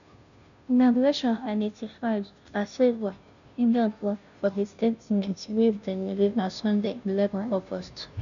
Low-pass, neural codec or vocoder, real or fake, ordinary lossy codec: 7.2 kHz; codec, 16 kHz, 0.5 kbps, FunCodec, trained on Chinese and English, 25 frames a second; fake; none